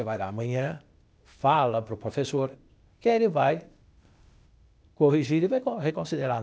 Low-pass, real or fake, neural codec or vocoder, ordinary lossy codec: none; fake; codec, 16 kHz, 0.8 kbps, ZipCodec; none